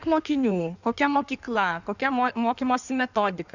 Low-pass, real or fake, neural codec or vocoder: 7.2 kHz; fake; codec, 16 kHz in and 24 kHz out, 1.1 kbps, FireRedTTS-2 codec